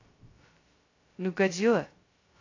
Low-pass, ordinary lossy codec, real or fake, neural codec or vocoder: 7.2 kHz; AAC, 32 kbps; fake; codec, 16 kHz, 0.2 kbps, FocalCodec